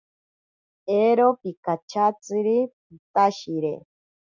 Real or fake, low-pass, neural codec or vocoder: real; 7.2 kHz; none